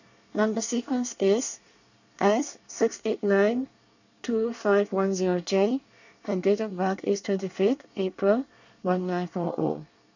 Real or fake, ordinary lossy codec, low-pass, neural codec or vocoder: fake; none; 7.2 kHz; codec, 24 kHz, 1 kbps, SNAC